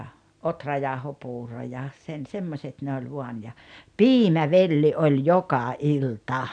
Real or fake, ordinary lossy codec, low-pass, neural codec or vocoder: real; none; 9.9 kHz; none